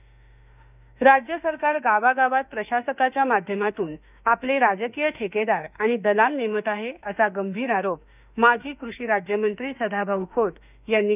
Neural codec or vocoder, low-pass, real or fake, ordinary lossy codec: autoencoder, 48 kHz, 32 numbers a frame, DAC-VAE, trained on Japanese speech; 3.6 kHz; fake; none